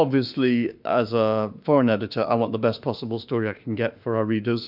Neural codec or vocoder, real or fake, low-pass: autoencoder, 48 kHz, 32 numbers a frame, DAC-VAE, trained on Japanese speech; fake; 5.4 kHz